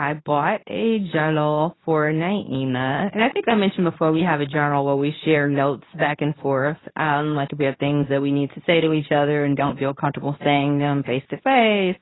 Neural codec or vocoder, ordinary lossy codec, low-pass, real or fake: codec, 24 kHz, 0.9 kbps, WavTokenizer, medium speech release version 2; AAC, 16 kbps; 7.2 kHz; fake